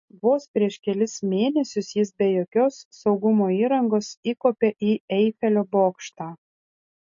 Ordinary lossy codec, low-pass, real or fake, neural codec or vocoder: MP3, 32 kbps; 7.2 kHz; real; none